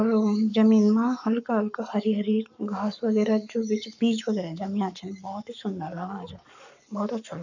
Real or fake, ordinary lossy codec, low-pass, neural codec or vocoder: fake; none; 7.2 kHz; codec, 44.1 kHz, 7.8 kbps, Pupu-Codec